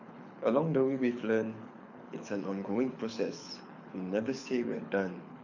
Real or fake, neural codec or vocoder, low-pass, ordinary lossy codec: fake; codec, 24 kHz, 6 kbps, HILCodec; 7.2 kHz; MP3, 48 kbps